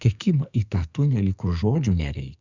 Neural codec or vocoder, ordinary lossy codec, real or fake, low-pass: codec, 44.1 kHz, 2.6 kbps, SNAC; Opus, 64 kbps; fake; 7.2 kHz